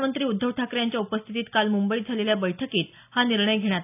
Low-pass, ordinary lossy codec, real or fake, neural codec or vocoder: 3.6 kHz; none; real; none